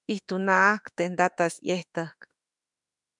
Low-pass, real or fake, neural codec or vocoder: 10.8 kHz; fake; autoencoder, 48 kHz, 32 numbers a frame, DAC-VAE, trained on Japanese speech